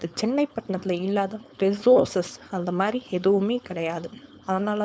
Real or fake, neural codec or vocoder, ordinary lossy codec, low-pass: fake; codec, 16 kHz, 4.8 kbps, FACodec; none; none